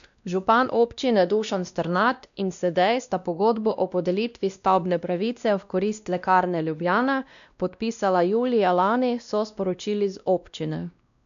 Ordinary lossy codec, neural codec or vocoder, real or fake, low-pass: none; codec, 16 kHz, 1 kbps, X-Codec, WavLM features, trained on Multilingual LibriSpeech; fake; 7.2 kHz